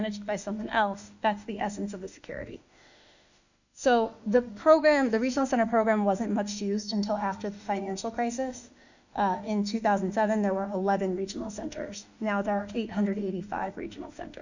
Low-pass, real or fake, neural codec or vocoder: 7.2 kHz; fake; autoencoder, 48 kHz, 32 numbers a frame, DAC-VAE, trained on Japanese speech